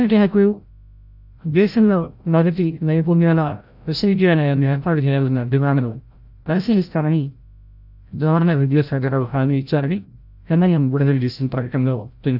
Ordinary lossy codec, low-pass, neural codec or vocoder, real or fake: none; 5.4 kHz; codec, 16 kHz, 0.5 kbps, FreqCodec, larger model; fake